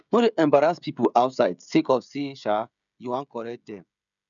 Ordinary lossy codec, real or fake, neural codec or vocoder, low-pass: none; fake; codec, 16 kHz, 16 kbps, FreqCodec, smaller model; 7.2 kHz